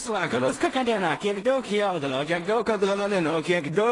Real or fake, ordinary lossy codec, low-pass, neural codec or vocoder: fake; AAC, 32 kbps; 10.8 kHz; codec, 16 kHz in and 24 kHz out, 0.4 kbps, LongCat-Audio-Codec, two codebook decoder